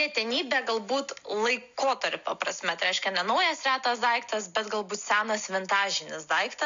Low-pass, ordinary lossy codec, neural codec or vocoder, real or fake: 7.2 kHz; MP3, 64 kbps; none; real